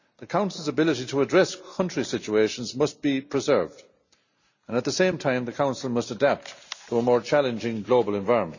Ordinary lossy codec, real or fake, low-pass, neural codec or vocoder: none; real; 7.2 kHz; none